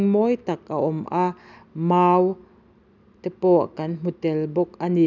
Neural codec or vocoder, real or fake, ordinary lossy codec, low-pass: none; real; none; 7.2 kHz